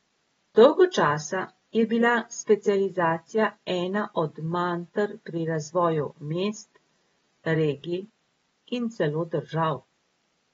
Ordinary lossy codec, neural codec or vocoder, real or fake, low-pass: AAC, 24 kbps; none; real; 19.8 kHz